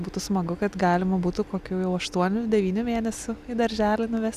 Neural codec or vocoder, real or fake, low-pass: none; real; 14.4 kHz